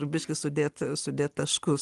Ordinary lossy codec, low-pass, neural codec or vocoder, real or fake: Opus, 24 kbps; 10.8 kHz; none; real